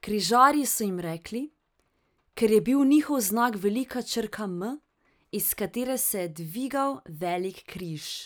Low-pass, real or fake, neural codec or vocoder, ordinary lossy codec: none; real; none; none